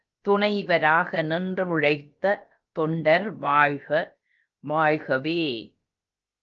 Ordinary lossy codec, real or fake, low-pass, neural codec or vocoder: Opus, 24 kbps; fake; 7.2 kHz; codec, 16 kHz, about 1 kbps, DyCAST, with the encoder's durations